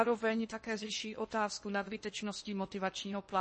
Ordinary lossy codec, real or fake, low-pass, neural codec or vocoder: MP3, 32 kbps; fake; 10.8 kHz; codec, 16 kHz in and 24 kHz out, 0.8 kbps, FocalCodec, streaming, 65536 codes